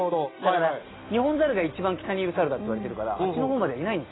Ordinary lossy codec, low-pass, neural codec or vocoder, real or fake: AAC, 16 kbps; 7.2 kHz; none; real